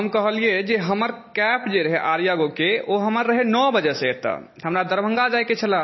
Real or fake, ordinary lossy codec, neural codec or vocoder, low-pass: real; MP3, 24 kbps; none; 7.2 kHz